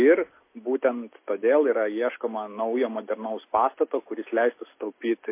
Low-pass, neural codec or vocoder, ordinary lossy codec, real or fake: 3.6 kHz; none; MP3, 24 kbps; real